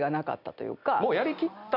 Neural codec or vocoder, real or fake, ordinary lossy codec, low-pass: none; real; none; 5.4 kHz